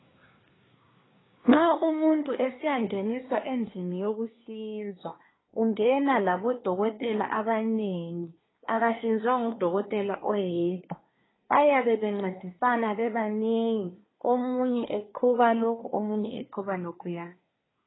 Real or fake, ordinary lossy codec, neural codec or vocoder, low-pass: fake; AAC, 16 kbps; codec, 24 kHz, 1 kbps, SNAC; 7.2 kHz